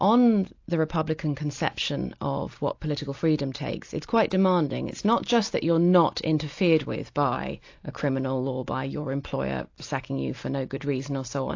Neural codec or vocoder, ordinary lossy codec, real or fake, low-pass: none; AAC, 48 kbps; real; 7.2 kHz